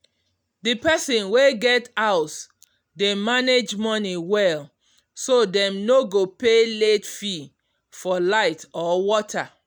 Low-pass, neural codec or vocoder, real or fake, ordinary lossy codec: none; none; real; none